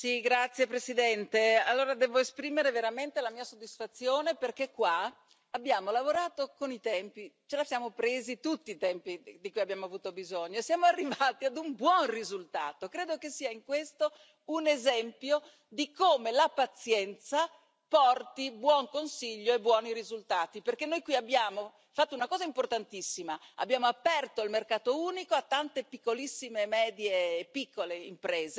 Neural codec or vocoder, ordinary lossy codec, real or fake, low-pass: none; none; real; none